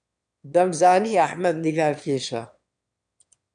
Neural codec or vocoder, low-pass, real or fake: autoencoder, 22.05 kHz, a latent of 192 numbers a frame, VITS, trained on one speaker; 9.9 kHz; fake